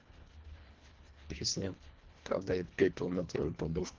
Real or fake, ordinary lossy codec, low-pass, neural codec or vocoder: fake; Opus, 16 kbps; 7.2 kHz; codec, 24 kHz, 1.5 kbps, HILCodec